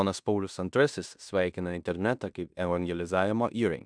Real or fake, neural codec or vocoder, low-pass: fake; codec, 16 kHz in and 24 kHz out, 0.9 kbps, LongCat-Audio-Codec, fine tuned four codebook decoder; 9.9 kHz